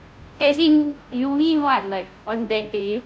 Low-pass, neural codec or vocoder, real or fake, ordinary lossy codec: none; codec, 16 kHz, 0.5 kbps, FunCodec, trained on Chinese and English, 25 frames a second; fake; none